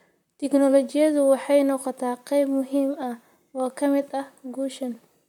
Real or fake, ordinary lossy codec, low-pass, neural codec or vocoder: fake; none; 19.8 kHz; vocoder, 44.1 kHz, 128 mel bands, Pupu-Vocoder